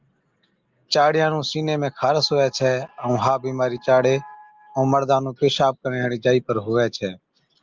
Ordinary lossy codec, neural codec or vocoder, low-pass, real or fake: Opus, 24 kbps; none; 7.2 kHz; real